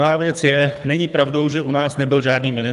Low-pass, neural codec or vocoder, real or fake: 10.8 kHz; codec, 24 kHz, 3 kbps, HILCodec; fake